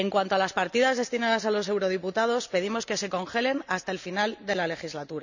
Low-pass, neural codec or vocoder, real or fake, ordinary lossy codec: 7.2 kHz; none; real; none